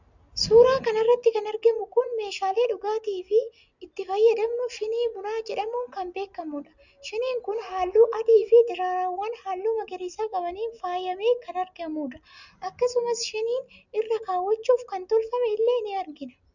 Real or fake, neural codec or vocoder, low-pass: real; none; 7.2 kHz